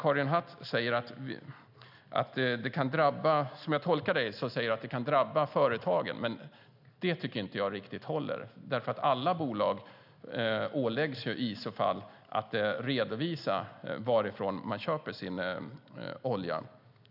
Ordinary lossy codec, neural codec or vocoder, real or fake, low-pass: none; none; real; 5.4 kHz